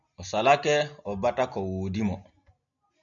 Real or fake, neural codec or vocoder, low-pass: real; none; 7.2 kHz